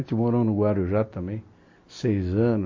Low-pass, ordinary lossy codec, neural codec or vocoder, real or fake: 7.2 kHz; MP3, 32 kbps; none; real